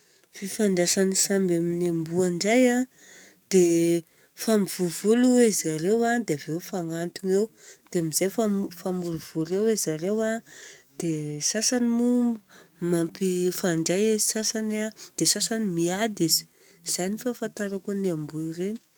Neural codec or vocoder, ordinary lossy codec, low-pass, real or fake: codec, 44.1 kHz, 7.8 kbps, DAC; none; none; fake